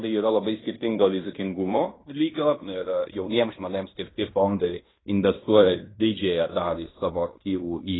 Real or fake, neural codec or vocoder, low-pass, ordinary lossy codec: fake; codec, 16 kHz in and 24 kHz out, 0.9 kbps, LongCat-Audio-Codec, fine tuned four codebook decoder; 7.2 kHz; AAC, 16 kbps